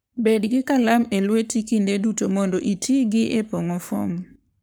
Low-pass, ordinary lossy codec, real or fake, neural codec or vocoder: none; none; fake; codec, 44.1 kHz, 7.8 kbps, Pupu-Codec